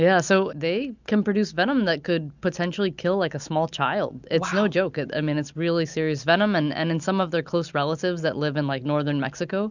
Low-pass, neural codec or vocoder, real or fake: 7.2 kHz; none; real